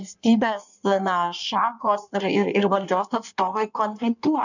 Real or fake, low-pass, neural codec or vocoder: fake; 7.2 kHz; codec, 16 kHz in and 24 kHz out, 1.1 kbps, FireRedTTS-2 codec